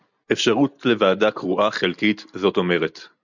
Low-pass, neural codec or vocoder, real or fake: 7.2 kHz; none; real